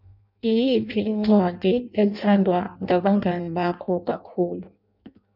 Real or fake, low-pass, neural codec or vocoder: fake; 5.4 kHz; codec, 16 kHz in and 24 kHz out, 0.6 kbps, FireRedTTS-2 codec